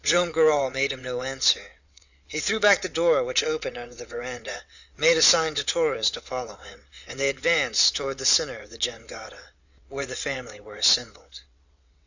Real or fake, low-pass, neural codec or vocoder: fake; 7.2 kHz; vocoder, 22.05 kHz, 80 mel bands, WaveNeXt